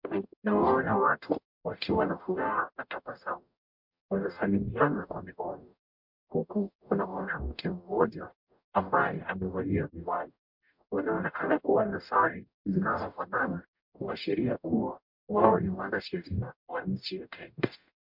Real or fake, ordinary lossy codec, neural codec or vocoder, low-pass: fake; MP3, 48 kbps; codec, 44.1 kHz, 0.9 kbps, DAC; 5.4 kHz